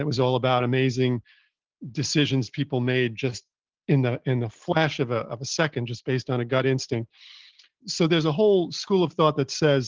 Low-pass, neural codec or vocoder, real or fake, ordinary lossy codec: 7.2 kHz; none; real; Opus, 16 kbps